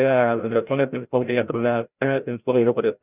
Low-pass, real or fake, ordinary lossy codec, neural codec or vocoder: 3.6 kHz; fake; none; codec, 16 kHz, 0.5 kbps, FreqCodec, larger model